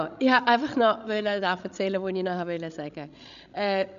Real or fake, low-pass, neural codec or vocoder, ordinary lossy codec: fake; 7.2 kHz; codec, 16 kHz, 16 kbps, FreqCodec, larger model; none